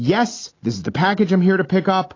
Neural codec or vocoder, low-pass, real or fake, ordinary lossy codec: none; 7.2 kHz; real; AAC, 32 kbps